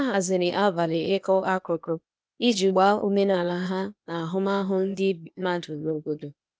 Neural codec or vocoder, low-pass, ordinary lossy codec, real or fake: codec, 16 kHz, 0.8 kbps, ZipCodec; none; none; fake